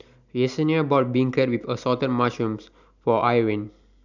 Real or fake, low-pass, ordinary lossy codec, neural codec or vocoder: real; 7.2 kHz; none; none